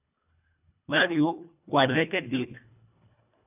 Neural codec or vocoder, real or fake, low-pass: codec, 24 kHz, 1.5 kbps, HILCodec; fake; 3.6 kHz